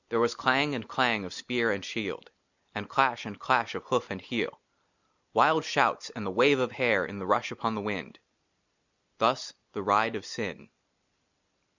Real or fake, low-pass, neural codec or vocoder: real; 7.2 kHz; none